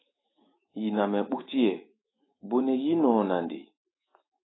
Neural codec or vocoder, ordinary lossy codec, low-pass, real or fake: autoencoder, 48 kHz, 128 numbers a frame, DAC-VAE, trained on Japanese speech; AAC, 16 kbps; 7.2 kHz; fake